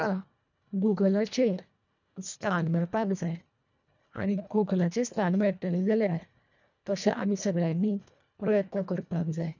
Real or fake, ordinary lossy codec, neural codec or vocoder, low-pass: fake; none; codec, 24 kHz, 1.5 kbps, HILCodec; 7.2 kHz